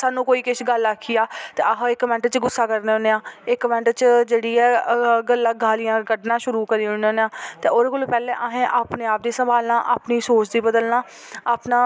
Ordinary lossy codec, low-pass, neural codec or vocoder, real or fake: none; none; none; real